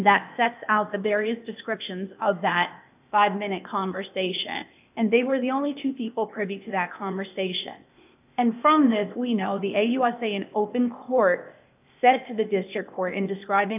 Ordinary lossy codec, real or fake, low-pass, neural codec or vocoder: AAC, 32 kbps; fake; 3.6 kHz; codec, 16 kHz, 0.8 kbps, ZipCodec